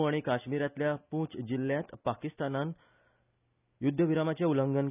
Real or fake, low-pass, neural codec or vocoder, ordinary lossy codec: real; 3.6 kHz; none; none